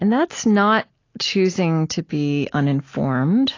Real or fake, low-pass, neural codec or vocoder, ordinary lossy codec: real; 7.2 kHz; none; AAC, 32 kbps